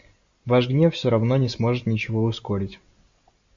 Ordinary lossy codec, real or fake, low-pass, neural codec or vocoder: AAC, 64 kbps; real; 7.2 kHz; none